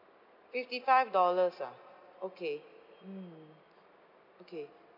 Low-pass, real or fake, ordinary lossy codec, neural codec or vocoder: 5.4 kHz; real; none; none